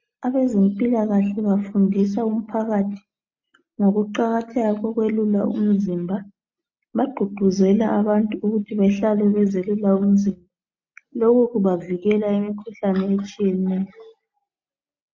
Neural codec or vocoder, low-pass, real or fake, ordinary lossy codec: none; 7.2 kHz; real; MP3, 48 kbps